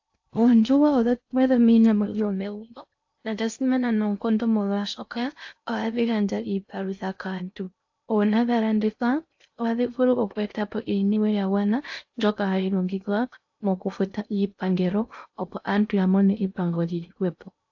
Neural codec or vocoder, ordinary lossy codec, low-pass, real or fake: codec, 16 kHz in and 24 kHz out, 0.6 kbps, FocalCodec, streaming, 2048 codes; AAC, 48 kbps; 7.2 kHz; fake